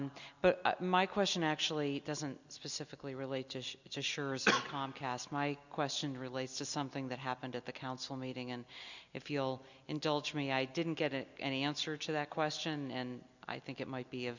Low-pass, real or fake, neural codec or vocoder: 7.2 kHz; real; none